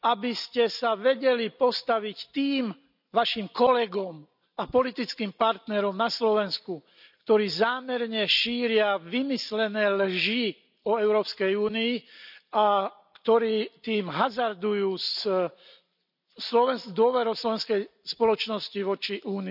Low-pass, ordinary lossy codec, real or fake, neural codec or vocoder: 5.4 kHz; none; real; none